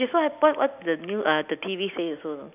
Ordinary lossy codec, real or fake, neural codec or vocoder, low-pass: none; real; none; 3.6 kHz